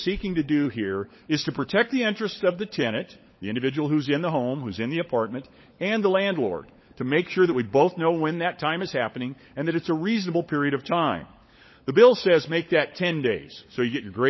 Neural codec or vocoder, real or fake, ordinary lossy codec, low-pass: codec, 16 kHz, 16 kbps, FunCodec, trained on LibriTTS, 50 frames a second; fake; MP3, 24 kbps; 7.2 kHz